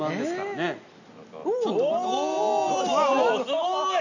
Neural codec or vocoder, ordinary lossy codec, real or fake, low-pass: none; none; real; 7.2 kHz